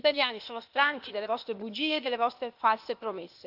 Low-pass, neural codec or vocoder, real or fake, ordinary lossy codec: 5.4 kHz; codec, 16 kHz, 0.8 kbps, ZipCodec; fake; AAC, 48 kbps